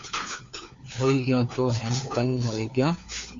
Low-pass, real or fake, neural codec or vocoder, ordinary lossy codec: 7.2 kHz; fake; codec, 16 kHz, 4 kbps, FunCodec, trained on LibriTTS, 50 frames a second; MP3, 48 kbps